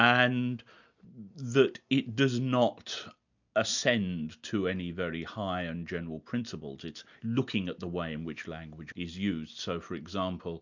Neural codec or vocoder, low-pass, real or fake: none; 7.2 kHz; real